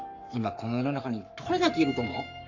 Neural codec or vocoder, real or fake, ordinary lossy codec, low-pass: codec, 44.1 kHz, 7.8 kbps, Pupu-Codec; fake; none; 7.2 kHz